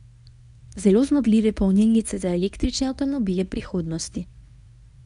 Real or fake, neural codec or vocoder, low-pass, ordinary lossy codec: fake; codec, 24 kHz, 0.9 kbps, WavTokenizer, medium speech release version 1; 10.8 kHz; MP3, 96 kbps